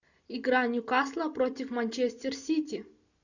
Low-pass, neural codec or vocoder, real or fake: 7.2 kHz; none; real